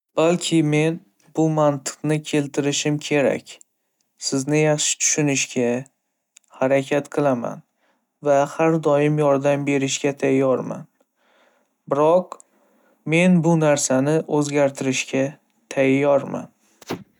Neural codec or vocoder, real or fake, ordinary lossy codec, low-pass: none; real; none; 19.8 kHz